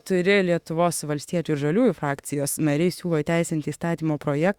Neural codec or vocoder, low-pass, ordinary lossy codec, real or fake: autoencoder, 48 kHz, 32 numbers a frame, DAC-VAE, trained on Japanese speech; 19.8 kHz; Opus, 64 kbps; fake